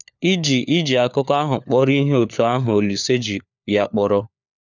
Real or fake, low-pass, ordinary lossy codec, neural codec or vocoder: fake; 7.2 kHz; none; codec, 16 kHz, 4 kbps, FunCodec, trained on LibriTTS, 50 frames a second